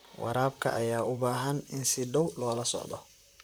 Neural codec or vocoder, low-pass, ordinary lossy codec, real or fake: vocoder, 44.1 kHz, 128 mel bands, Pupu-Vocoder; none; none; fake